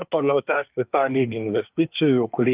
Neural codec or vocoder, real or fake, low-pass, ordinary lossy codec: codec, 24 kHz, 1 kbps, SNAC; fake; 3.6 kHz; Opus, 24 kbps